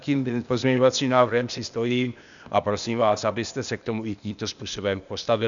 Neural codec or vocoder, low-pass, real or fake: codec, 16 kHz, 0.8 kbps, ZipCodec; 7.2 kHz; fake